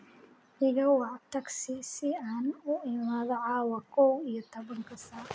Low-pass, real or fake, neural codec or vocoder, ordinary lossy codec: none; real; none; none